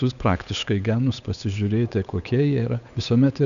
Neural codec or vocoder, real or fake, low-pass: codec, 16 kHz, 8 kbps, FunCodec, trained on Chinese and English, 25 frames a second; fake; 7.2 kHz